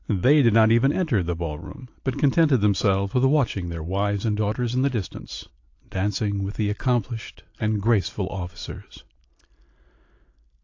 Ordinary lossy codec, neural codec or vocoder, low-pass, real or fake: AAC, 48 kbps; none; 7.2 kHz; real